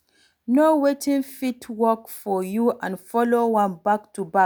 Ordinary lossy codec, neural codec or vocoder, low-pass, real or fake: none; none; none; real